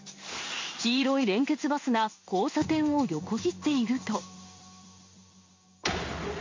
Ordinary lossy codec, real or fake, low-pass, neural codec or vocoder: MP3, 48 kbps; fake; 7.2 kHz; codec, 16 kHz in and 24 kHz out, 1 kbps, XY-Tokenizer